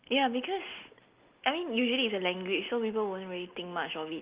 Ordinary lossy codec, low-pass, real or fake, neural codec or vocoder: Opus, 32 kbps; 3.6 kHz; real; none